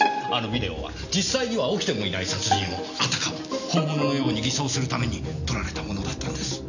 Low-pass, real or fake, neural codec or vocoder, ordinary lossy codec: 7.2 kHz; real; none; none